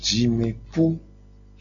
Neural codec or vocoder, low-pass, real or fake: none; 7.2 kHz; real